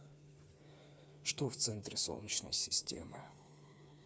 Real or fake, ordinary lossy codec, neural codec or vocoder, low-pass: fake; none; codec, 16 kHz, 4 kbps, FreqCodec, smaller model; none